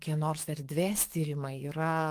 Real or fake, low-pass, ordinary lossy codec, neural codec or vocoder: fake; 14.4 kHz; Opus, 32 kbps; codec, 44.1 kHz, 7.8 kbps, DAC